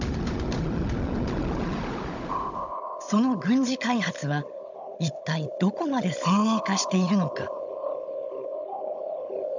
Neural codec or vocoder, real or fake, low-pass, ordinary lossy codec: codec, 16 kHz, 16 kbps, FunCodec, trained on Chinese and English, 50 frames a second; fake; 7.2 kHz; none